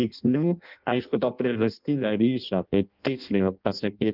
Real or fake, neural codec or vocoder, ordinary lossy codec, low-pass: fake; codec, 16 kHz in and 24 kHz out, 0.6 kbps, FireRedTTS-2 codec; Opus, 32 kbps; 5.4 kHz